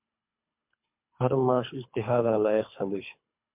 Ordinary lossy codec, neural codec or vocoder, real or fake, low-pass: MP3, 32 kbps; codec, 24 kHz, 3 kbps, HILCodec; fake; 3.6 kHz